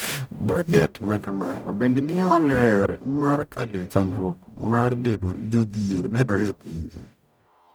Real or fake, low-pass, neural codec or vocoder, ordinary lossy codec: fake; none; codec, 44.1 kHz, 0.9 kbps, DAC; none